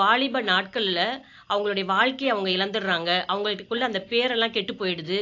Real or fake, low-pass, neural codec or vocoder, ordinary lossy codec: real; 7.2 kHz; none; AAC, 48 kbps